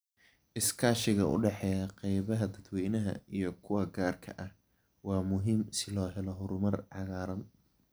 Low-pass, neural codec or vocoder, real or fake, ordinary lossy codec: none; none; real; none